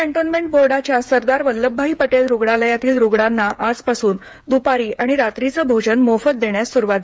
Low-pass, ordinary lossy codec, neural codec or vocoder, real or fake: none; none; codec, 16 kHz, 8 kbps, FreqCodec, smaller model; fake